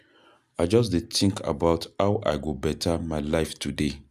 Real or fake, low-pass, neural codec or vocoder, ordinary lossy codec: real; 14.4 kHz; none; none